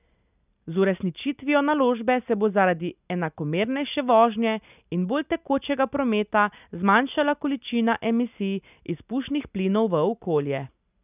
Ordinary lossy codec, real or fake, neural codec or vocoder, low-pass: none; real; none; 3.6 kHz